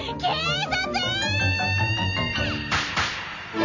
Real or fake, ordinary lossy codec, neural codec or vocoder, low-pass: fake; none; vocoder, 44.1 kHz, 128 mel bands every 256 samples, BigVGAN v2; 7.2 kHz